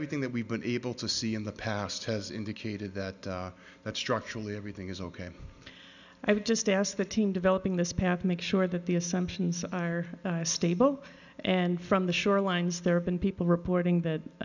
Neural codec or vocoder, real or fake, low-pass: none; real; 7.2 kHz